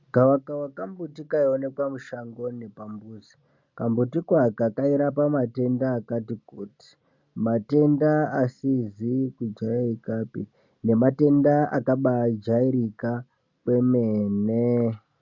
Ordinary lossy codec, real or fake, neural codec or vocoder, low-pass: MP3, 64 kbps; real; none; 7.2 kHz